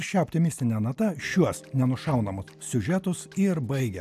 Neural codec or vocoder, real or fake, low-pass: none; real; 14.4 kHz